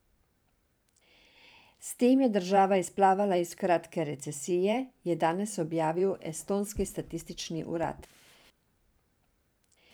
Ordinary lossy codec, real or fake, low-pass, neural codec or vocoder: none; fake; none; vocoder, 44.1 kHz, 128 mel bands every 512 samples, BigVGAN v2